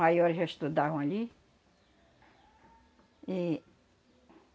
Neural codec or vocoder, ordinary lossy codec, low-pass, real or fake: none; none; none; real